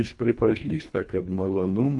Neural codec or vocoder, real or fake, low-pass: codec, 24 kHz, 1.5 kbps, HILCodec; fake; 10.8 kHz